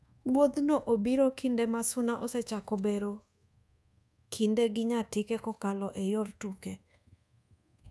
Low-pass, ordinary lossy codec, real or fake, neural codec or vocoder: none; none; fake; codec, 24 kHz, 1.2 kbps, DualCodec